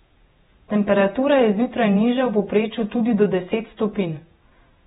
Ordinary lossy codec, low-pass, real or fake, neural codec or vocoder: AAC, 16 kbps; 19.8 kHz; fake; vocoder, 48 kHz, 128 mel bands, Vocos